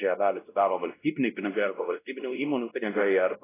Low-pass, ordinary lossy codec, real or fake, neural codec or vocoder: 3.6 kHz; AAC, 16 kbps; fake; codec, 16 kHz, 0.5 kbps, X-Codec, WavLM features, trained on Multilingual LibriSpeech